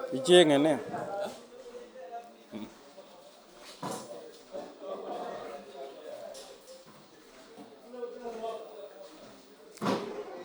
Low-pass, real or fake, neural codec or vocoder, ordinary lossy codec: none; real; none; none